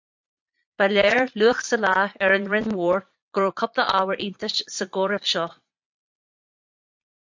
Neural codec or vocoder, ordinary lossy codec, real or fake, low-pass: vocoder, 22.05 kHz, 80 mel bands, Vocos; MP3, 64 kbps; fake; 7.2 kHz